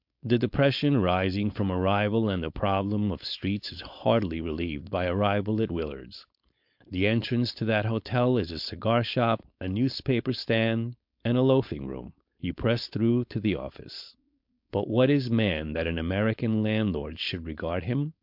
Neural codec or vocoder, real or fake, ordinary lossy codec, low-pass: codec, 16 kHz, 4.8 kbps, FACodec; fake; MP3, 48 kbps; 5.4 kHz